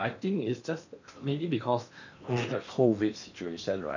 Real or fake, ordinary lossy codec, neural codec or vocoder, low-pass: fake; none; codec, 16 kHz in and 24 kHz out, 0.8 kbps, FocalCodec, streaming, 65536 codes; 7.2 kHz